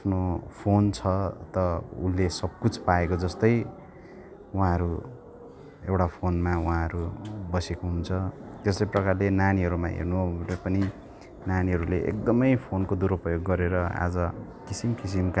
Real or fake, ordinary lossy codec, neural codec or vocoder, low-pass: real; none; none; none